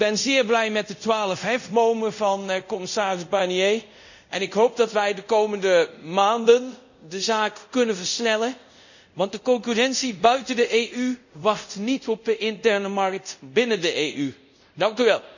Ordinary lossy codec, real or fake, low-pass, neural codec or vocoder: none; fake; 7.2 kHz; codec, 24 kHz, 0.5 kbps, DualCodec